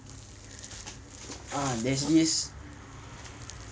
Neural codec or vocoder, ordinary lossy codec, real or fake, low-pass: none; none; real; none